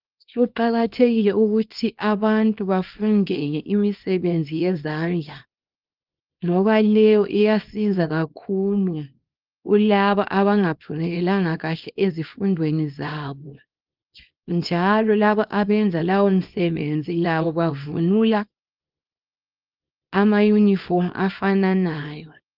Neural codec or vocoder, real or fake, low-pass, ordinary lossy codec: codec, 24 kHz, 0.9 kbps, WavTokenizer, small release; fake; 5.4 kHz; Opus, 32 kbps